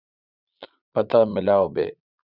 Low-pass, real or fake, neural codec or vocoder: 5.4 kHz; fake; vocoder, 22.05 kHz, 80 mel bands, Vocos